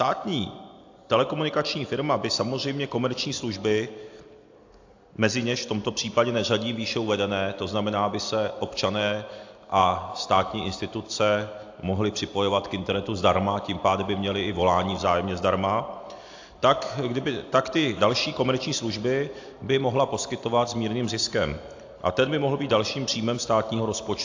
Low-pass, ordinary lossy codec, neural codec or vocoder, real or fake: 7.2 kHz; AAC, 48 kbps; none; real